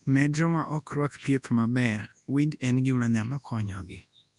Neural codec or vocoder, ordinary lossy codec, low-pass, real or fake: codec, 24 kHz, 0.9 kbps, WavTokenizer, large speech release; none; 10.8 kHz; fake